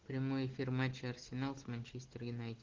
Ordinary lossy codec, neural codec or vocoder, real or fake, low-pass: Opus, 16 kbps; none; real; 7.2 kHz